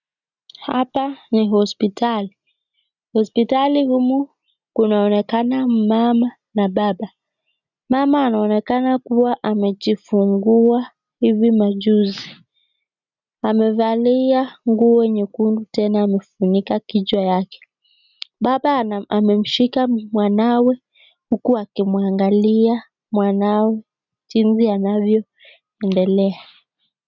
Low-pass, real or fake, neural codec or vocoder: 7.2 kHz; real; none